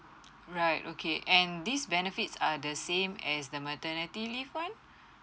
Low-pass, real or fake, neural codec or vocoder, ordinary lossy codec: none; real; none; none